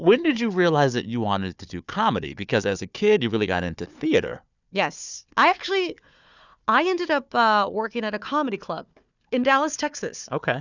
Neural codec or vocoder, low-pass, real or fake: codec, 16 kHz, 4 kbps, FunCodec, trained on Chinese and English, 50 frames a second; 7.2 kHz; fake